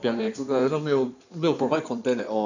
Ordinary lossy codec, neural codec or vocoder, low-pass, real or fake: none; codec, 16 kHz in and 24 kHz out, 2.2 kbps, FireRedTTS-2 codec; 7.2 kHz; fake